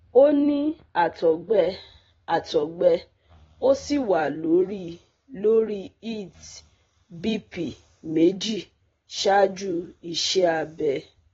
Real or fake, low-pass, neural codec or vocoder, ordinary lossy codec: fake; 19.8 kHz; vocoder, 44.1 kHz, 128 mel bands every 256 samples, BigVGAN v2; AAC, 24 kbps